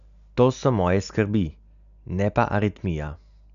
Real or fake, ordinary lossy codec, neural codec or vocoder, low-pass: real; none; none; 7.2 kHz